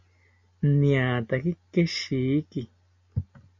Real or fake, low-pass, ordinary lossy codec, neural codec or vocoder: real; 7.2 kHz; MP3, 48 kbps; none